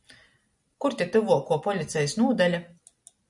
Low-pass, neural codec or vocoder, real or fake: 10.8 kHz; none; real